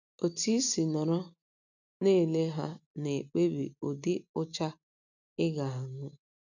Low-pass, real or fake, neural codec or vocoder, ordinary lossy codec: 7.2 kHz; real; none; none